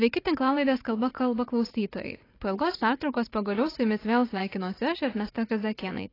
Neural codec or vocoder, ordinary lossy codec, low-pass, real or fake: vocoder, 44.1 kHz, 80 mel bands, Vocos; AAC, 24 kbps; 5.4 kHz; fake